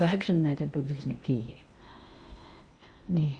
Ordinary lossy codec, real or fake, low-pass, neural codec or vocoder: Opus, 64 kbps; fake; 9.9 kHz; codec, 16 kHz in and 24 kHz out, 0.8 kbps, FocalCodec, streaming, 65536 codes